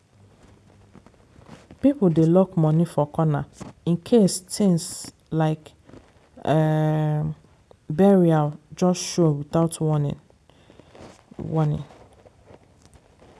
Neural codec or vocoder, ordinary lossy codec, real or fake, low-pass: none; none; real; none